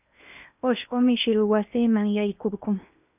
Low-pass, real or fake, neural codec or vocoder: 3.6 kHz; fake; codec, 16 kHz in and 24 kHz out, 0.8 kbps, FocalCodec, streaming, 65536 codes